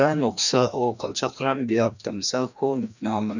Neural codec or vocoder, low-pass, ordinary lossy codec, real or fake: codec, 16 kHz, 1 kbps, FreqCodec, larger model; 7.2 kHz; none; fake